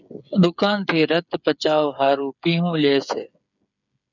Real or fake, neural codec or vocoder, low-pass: fake; codec, 16 kHz, 8 kbps, FreqCodec, smaller model; 7.2 kHz